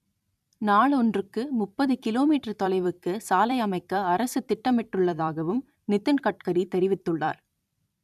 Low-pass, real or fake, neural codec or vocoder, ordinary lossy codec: 14.4 kHz; fake; vocoder, 44.1 kHz, 128 mel bands every 256 samples, BigVGAN v2; none